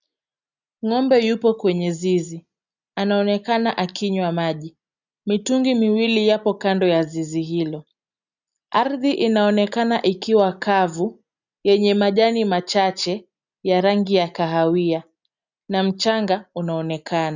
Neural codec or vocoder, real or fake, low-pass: none; real; 7.2 kHz